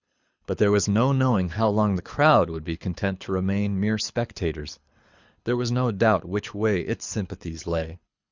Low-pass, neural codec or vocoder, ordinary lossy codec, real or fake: 7.2 kHz; codec, 24 kHz, 6 kbps, HILCodec; Opus, 64 kbps; fake